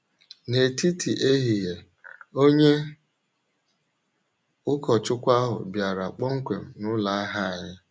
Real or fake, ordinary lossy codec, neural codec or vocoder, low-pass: real; none; none; none